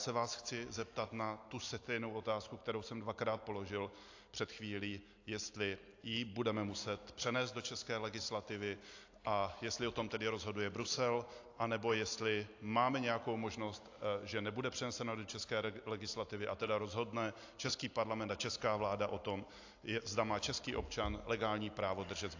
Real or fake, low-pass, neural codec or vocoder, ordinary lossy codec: real; 7.2 kHz; none; AAC, 48 kbps